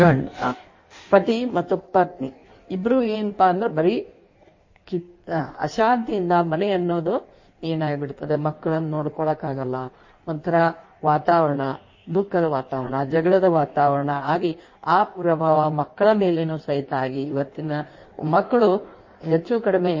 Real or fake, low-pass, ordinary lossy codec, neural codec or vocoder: fake; 7.2 kHz; MP3, 32 kbps; codec, 16 kHz in and 24 kHz out, 1.1 kbps, FireRedTTS-2 codec